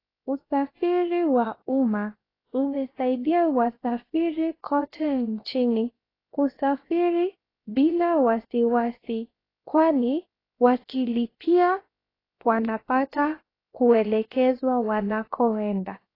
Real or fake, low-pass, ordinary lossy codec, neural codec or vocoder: fake; 5.4 kHz; AAC, 24 kbps; codec, 16 kHz, about 1 kbps, DyCAST, with the encoder's durations